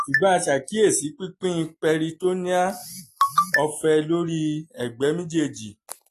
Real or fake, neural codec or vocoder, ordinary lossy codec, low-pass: real; none; AAC, 48 kbps; 14.4 kHz